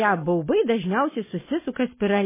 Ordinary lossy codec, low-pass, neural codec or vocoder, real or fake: MP3, 16 kbps; 3.6 kHz; none; real